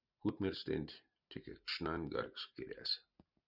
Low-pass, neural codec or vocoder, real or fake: 5.4 kHz; none; real